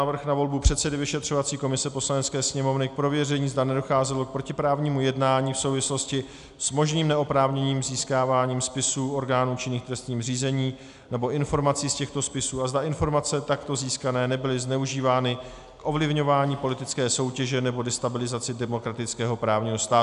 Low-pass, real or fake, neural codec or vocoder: 10.8 kHz; real; none